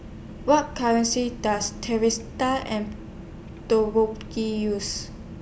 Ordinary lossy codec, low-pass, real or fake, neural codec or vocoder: none; none; real; none